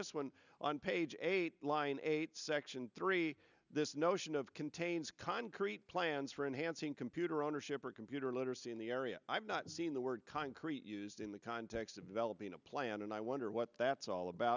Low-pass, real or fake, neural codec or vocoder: 7.2 kHz; real; none